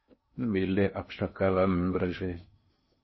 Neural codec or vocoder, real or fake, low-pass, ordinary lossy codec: codec, 16 kHz in and 24 kHz out, 0.6 kbps, FocalCodec, streaming, 2048 codes; fake; 7.2 kHz; MP3, 24 kbps